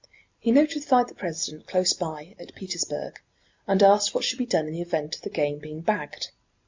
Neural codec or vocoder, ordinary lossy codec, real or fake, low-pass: none; MP3, 64 kbps; real; 7.2 kHz